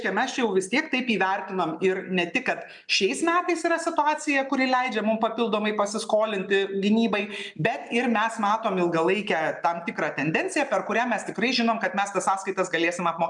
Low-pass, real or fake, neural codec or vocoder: 10.8 kHz; real; none